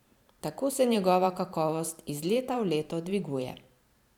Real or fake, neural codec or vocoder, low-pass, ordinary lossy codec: fake; vocoder, 44.1 kHz, 128 mel bands every 512 samples, BigVGAN v2; 19.8 kHz; none